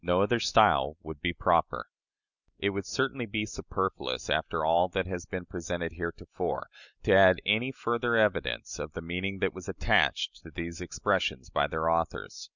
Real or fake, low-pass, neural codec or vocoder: real; 7.2 kHz; none